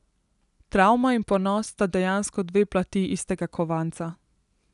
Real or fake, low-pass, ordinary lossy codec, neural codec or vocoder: real; 10.8 kHz; none; none